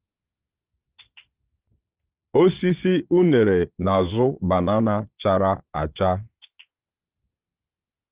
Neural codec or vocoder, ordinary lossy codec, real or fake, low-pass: vocoder, 22.05 kHz, 80 mel bands, Vocos; Opus, 32 kbps; fake; 3.6 kHz